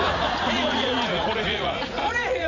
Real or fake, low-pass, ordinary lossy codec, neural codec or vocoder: fake; 7.2 kHz; none; vocoder, 44.1 kHz, 80 mel bands, Vocos